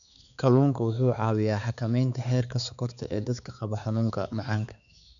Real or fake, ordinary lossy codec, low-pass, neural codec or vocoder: fake; none; 7.2 kHz; codec, 16 kHz, 4 kbps, X-Codec, HuBERT features, trained on balanced general audio